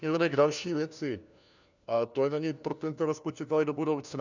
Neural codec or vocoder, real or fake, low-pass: codec, 16 kHz, 1 kbps, FunCodec, trained on LibriTTS, 50 frames a second; fake; 7.2 kHz